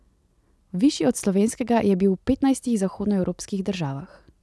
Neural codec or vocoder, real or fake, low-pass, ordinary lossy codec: none; real; none; none